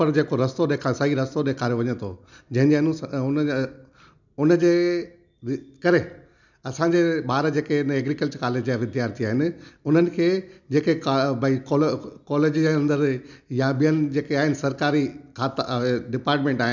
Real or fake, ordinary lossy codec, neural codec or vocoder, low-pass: real; none; none; 7.2 kHz